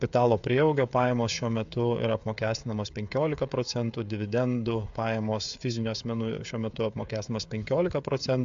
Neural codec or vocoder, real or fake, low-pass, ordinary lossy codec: codec, 16 kHz, 16 kbps, FreqCodec, smaller model; fake; 7.2 kHz; AAC, 64 kbps